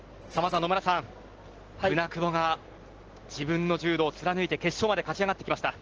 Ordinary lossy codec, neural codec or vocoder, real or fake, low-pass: Opus, 16 kbps; none; real; 7.2 kHz